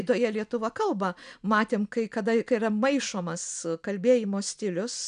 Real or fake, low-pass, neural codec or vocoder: real; 9.9 kHz; none